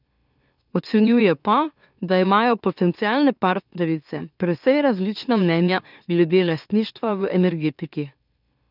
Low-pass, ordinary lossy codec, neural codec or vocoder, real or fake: 5.4 kHz; none; autoencoder, 44.1 kHz, a latent of 192 numbers a frame, MeloTTS; fake